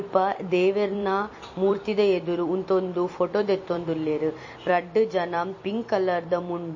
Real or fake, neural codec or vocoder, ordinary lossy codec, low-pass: fake; vocoder, 44.1 kHz, 128 mel bands every 256 samples, BigVGAN v2; MP3, 32 kbps; 7.2 kHz